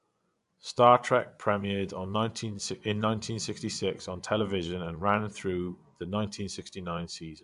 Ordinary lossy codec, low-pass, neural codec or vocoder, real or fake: AAC, 96 kbps; 10.8 kHz; vocoder, 24 kHz, 100 mel bands, Vocos; fake